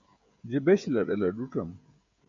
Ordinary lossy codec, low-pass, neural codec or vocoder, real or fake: AAC, 64 kbps; 7.2 kHz; codec, 16 kHz, 16 kbps, FreqCodec, smaller model; fake